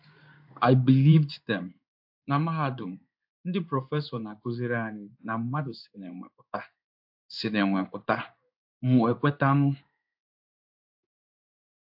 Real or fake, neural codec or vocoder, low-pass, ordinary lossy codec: fake; codec, 16 kHz in and 24 kHz out, 1 kbps, XY-Tokenizer; 5.4 kHz; AAC, 48 kbps